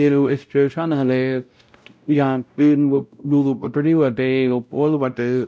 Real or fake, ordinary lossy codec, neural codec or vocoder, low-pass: fake; none; codec, 16 kHz, 0.5 kbps, X-Codec, WavLM features, trained on Multilingual LibriSpeech; none